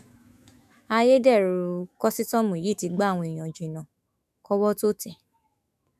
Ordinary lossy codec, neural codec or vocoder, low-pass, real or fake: none; autoencoder, 48 kHz, 128 numbers a frame, DAC-VAE, trained on Japanese speech; 14.4 kHz; fake